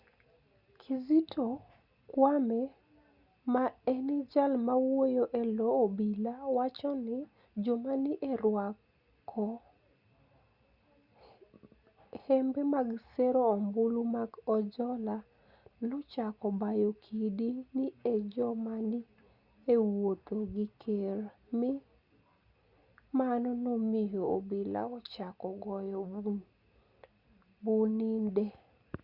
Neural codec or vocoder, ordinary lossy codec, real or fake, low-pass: none; Opus, 64 kbps; real; 5.4 kHz